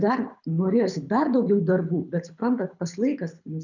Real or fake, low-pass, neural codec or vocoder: fake; 7.2 kHz; codec, 24 kHz, 6 kbps, HILCodec